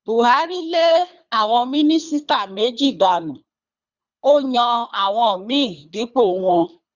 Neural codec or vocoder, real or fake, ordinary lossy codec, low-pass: codec, 24 kHz, 3 kbps, HILCodec; fake; Opus, 64 kbps; 7.2 kHz